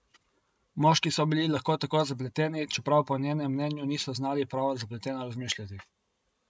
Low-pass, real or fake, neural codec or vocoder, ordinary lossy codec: none; real; none; none